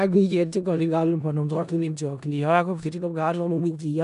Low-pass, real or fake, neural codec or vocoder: 10.8 kHz; fake; codec, 16 kHz in and 24 kHz out, 0.4 kbps, LongCat-Audio-Codec, four codebook decoder